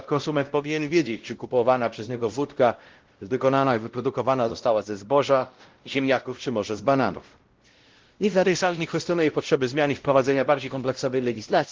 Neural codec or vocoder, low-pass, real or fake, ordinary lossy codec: codec, 16 kHz, 0.5 kbps, X-Codec, WavLM features, trained on Multilingual LibriSpeech; 7.2 kHz; fake; Opus, 16 kbps